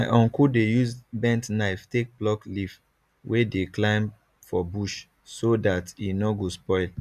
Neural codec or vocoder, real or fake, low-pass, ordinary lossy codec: none; real; 14.4 kHz; none